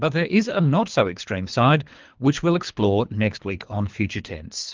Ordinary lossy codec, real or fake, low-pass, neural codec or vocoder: Opus, 32 kbps; fake; 7.2 kHz; codec, 24 kHz, 3 kbps, HILCodec